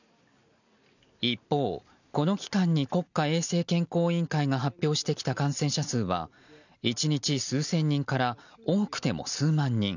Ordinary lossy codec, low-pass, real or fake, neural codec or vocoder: none; 7.2 kHz; real; none